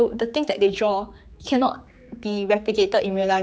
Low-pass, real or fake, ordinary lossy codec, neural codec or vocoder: none; fake; none; codec, 16 kHz, 4 kbps, X-Codec, HuBERT features, trained on general audio